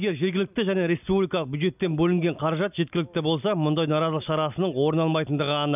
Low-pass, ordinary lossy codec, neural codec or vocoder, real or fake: 3.6 kHz; none; none; real